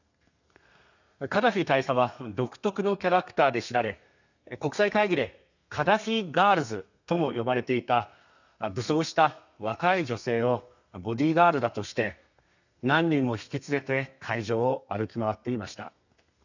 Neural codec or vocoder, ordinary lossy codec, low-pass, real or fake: codec, 32 kHz, 1.9 kbps, SNAC; none; 7.2 kHz; fake